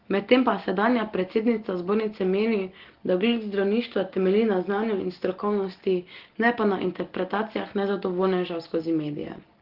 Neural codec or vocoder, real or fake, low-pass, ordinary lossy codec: none; real; 5.4 kHz; Opus, 16 kbps